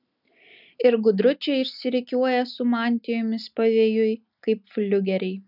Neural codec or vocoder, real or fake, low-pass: none; real; 5.4 kHz